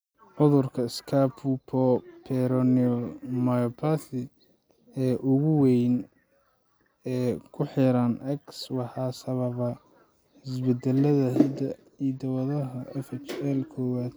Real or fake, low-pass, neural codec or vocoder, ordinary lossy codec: real; none; none; none